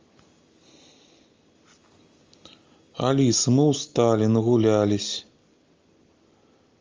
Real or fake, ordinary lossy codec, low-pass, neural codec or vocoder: real; Opus, 32 kbps; 7.2 kHz; none